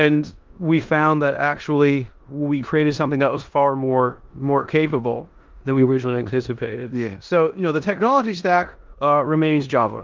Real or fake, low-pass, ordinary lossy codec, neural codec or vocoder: fake; 7.2 kHz; Opus, 32 kbps; codec, 16 kHz in and 24 kHz out, 0.9 kbps, LongCat-Audio-Codec, four codebook decoder